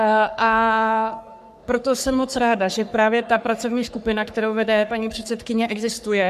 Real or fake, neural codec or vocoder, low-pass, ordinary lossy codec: fake; codec, 44.1 kHz, 3.4 kbps, Pupu-Codec; 14.4 kHz; MP3, 96 kbps